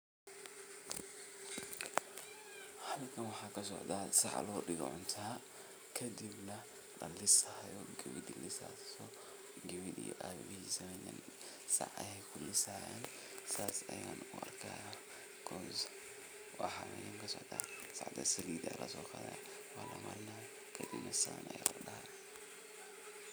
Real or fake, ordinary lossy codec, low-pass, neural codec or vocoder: real; none; none; none